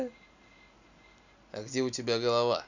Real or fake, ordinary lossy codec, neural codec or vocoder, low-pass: real; none; none; 7.2 kHz